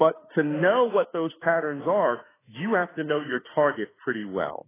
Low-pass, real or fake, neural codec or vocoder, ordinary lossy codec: 3.6 kHz; fake; autoencoder, 48 kHz, 32 numbers a frame, DAC-VAE, trained on Japanese speech; AAC, 16 kbps